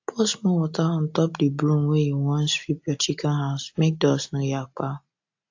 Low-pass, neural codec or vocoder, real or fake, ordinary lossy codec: 7.2 kHz; none; real; AAC, 48 kbps